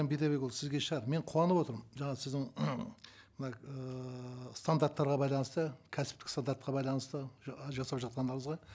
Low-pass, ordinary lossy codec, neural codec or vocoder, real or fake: none; none; none; real